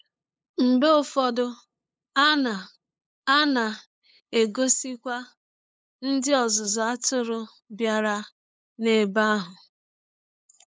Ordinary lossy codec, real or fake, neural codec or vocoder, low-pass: none; fake; codec, 16 kHz, 8 kbps, FunCodec, trained on LibriTTS, 25 frames a second; none